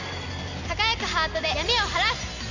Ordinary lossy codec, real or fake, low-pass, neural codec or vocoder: none; real; 7.2 kHz; none